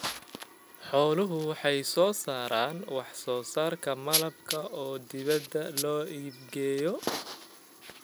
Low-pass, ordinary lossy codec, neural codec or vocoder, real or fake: none; none; none; real